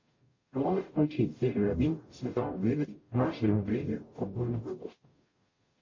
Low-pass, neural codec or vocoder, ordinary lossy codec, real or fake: 7.2 kHz; codec, 44.1 kHz, 0.9 kbps, DAC; MP3, 32 kbps; fake